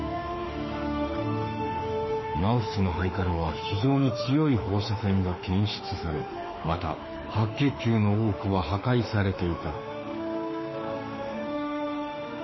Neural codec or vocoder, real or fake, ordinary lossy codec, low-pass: autoencoder, 48 kHz, 32 numbers a frame, DAC-VAE, trained on Japanese speech; fake; MP3, 24 kbps; 7.2 kHz